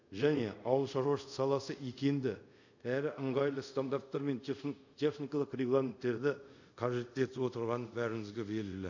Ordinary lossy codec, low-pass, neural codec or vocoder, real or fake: none; 7.2 kHz; codec, 24 kHz, 0.5 kbps, DualCodec; fake